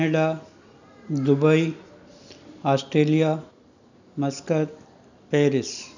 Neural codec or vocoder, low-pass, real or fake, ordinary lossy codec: none; 7.2 kHz; real; none